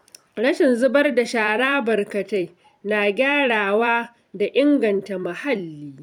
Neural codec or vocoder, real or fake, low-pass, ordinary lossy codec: vocoder, 44.1 kHz, 128 mel bands every 256 samples, BigVGAN v2; fake; 19.8 kHz; none